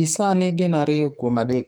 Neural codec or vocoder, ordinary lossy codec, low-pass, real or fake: codec, 44.1 kHz, 2.6 kbps, SNAC; none; none; fake